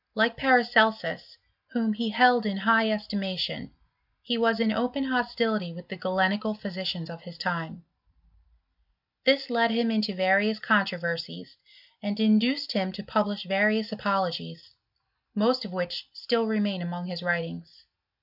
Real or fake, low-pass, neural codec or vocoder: real; 5.4 kHz; none